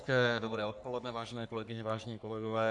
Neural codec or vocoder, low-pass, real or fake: codec, 44.1 kHz, 3.4 kbps, Pupu-Codec; 10.8 kHz; fake